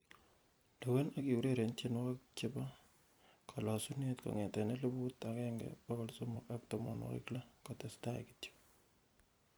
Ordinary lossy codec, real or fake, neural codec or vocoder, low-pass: none; fake; vocoder, 44.1 kHz, 128 mel bands every 256 samples, BigVGAN v2; none